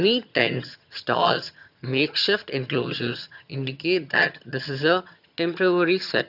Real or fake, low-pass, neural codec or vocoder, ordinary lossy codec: fake; 5.4 kHz; vocoder, 22.05 kHz, 80 mel bands, HiFi-GAN; none